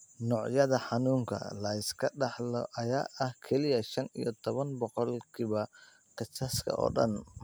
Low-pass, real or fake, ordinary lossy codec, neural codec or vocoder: none; real; none; none